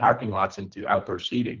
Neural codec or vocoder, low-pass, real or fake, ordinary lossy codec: codec, 24 kHz, 3 kbps, HILCodec; 7.2 kHz; fake; Opus, 24 kbps